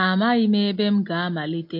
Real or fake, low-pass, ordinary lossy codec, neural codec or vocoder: real; 5.4 kHz; MP3, 32 kbps; none